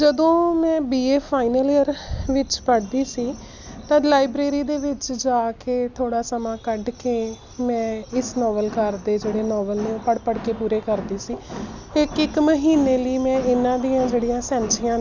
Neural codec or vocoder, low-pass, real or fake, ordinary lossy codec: none; 7.2 kHz; real; none